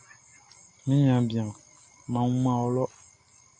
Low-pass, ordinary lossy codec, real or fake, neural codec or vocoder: 9.9 kHz; MP3, 48 kbps; real; none